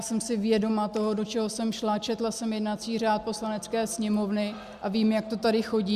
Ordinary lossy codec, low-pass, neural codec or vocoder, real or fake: Opus, 64 kbps; 14.4 kHz; none; real